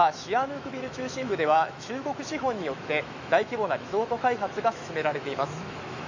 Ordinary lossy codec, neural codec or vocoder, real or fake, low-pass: MP3, 64 kbps; autoencoder, 48 kHz, 128 numbers a frame, DAC-VAE, trained on Japanese speech; fake; 7.2 kHz